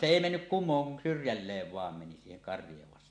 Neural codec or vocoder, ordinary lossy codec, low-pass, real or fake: none; MP3, 48 kbps; 9.9 kHz; real